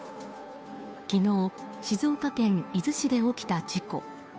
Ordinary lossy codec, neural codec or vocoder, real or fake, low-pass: none; codec, 16 kHz, 2 kbps, FunCodec, trained on Chinese and English, 25 frames a second; fake; none